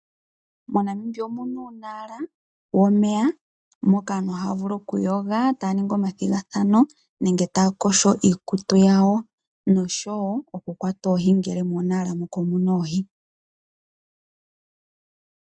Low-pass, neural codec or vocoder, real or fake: 9.9 kHz; none; real